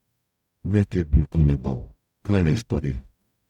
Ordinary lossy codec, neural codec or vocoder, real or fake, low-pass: none; codec, 44.1 kHz, 0.9 kbps, DAC; fake; 19.8 kHz